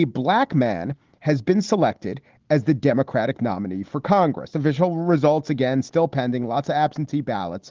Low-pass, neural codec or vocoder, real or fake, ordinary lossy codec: 7.2 kHz; none; real; Opus, 16 kbps